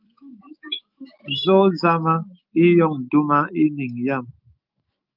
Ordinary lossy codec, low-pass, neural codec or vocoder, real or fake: Opus, 32 kbps; 5.4 kHz; autoencoder, 48 kHz, 128 numbers a frame, DAC-VAE, trained on Japanese speech; fake